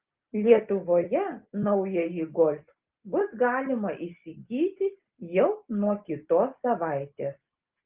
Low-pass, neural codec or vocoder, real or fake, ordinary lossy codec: 3.6 kHz; none; real; Opus, 32 kbps